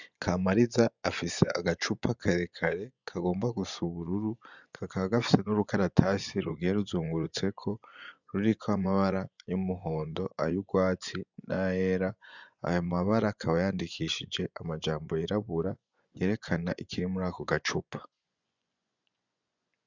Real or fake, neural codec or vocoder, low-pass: fake; vocoder, 24 kHz, 100 mel bands, Vocos; 7.2 kHz